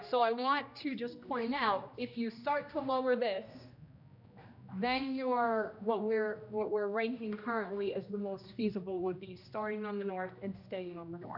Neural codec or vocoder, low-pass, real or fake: codec, 16 kHz, 1 kbps, X-Codec, HuBERT features, trained on general audio; 5.4 kHz; fake